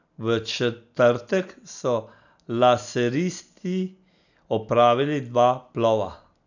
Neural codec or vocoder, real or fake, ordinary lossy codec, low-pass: none; real; none; 7.2 kHz